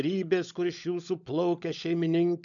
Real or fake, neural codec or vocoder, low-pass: fake; codec, 16 kHz, 16 kbps, FunCodec, trained on LibriTTS, 50 frames a second; 7.2 kHz